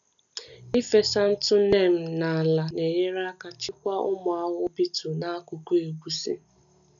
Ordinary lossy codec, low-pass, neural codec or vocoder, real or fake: none; 7.2 kHz; none; real